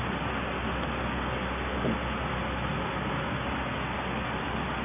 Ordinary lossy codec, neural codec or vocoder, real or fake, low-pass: none; none; real; 3.6 kHz